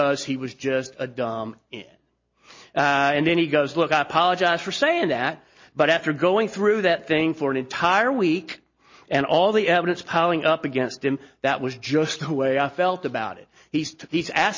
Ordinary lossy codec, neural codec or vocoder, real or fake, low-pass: MP3, 32 kbps; none; real; 7.2 kHz